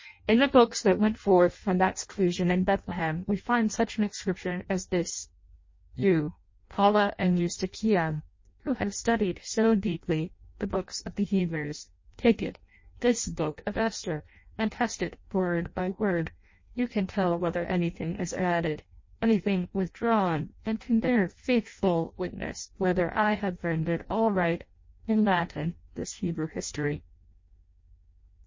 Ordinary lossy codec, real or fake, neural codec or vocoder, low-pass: MP3, 32 kbps; fake; codec, 16 kHz in and 24 kHz out, 0.6 kbps, FireRedTTS-2 codec; 7.2 kHz